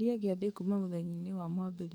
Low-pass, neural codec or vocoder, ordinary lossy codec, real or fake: none; codec, 44.1 kHz, 7.8 kbps, DAC; none; fake